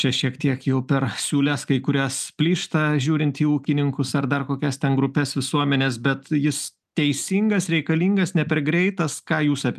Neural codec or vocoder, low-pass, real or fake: none; 14.4 kHz; real